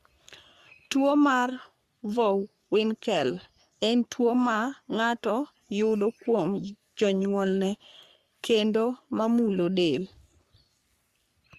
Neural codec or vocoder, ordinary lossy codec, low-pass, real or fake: codec, 44.1 kHz, 3.4 kbps, Pupu-Codec; Opus, 64 kbps; 14.4 kHz; fake